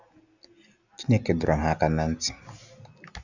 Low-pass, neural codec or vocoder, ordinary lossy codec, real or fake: 7.2 kHz; none; none; real